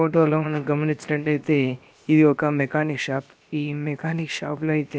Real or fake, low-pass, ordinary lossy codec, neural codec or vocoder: fake; none; none; codec, 16 kHz, about 1 kbps, DyCAST, with the encoder's durations